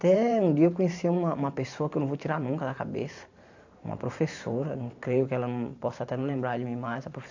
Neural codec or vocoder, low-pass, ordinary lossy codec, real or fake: vocoder, 44.1 kHz, 128 mel bands every 512 samples, BigVGAN v2; 7.2 kHz; AAC, 48 kbps; fake